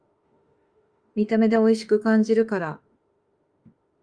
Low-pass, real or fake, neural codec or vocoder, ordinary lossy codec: 9.9 kHz; fake; autoencoder, 48 kHz, 32 numbers a frame, DAC-VAE, trained on Japanese speech; Opus, 32 kbps